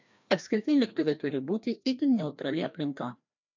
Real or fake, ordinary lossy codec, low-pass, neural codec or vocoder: fake; MP3, 64 kbps; 7.2 kHz; codec, 16 kHz, 1 kbps, FreqCodec, larger model